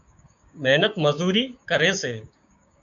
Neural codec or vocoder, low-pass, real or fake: codec, 16 kHz, 6 kbps, DAC; 7.2 kHz; fake